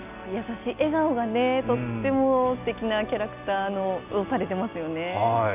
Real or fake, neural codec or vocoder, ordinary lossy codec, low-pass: real; none; none; 3.6 kHz